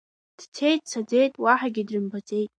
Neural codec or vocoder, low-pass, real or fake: none; 9.9 kHz; real